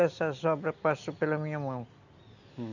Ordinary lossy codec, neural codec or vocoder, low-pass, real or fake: none; none; 7.2 kHz; real